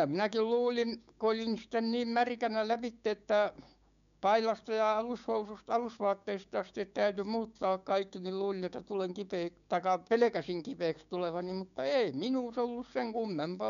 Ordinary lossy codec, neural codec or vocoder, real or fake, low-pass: none; codec, 16 kHz, 6 kbps, DAC; fake; 7.2 kHz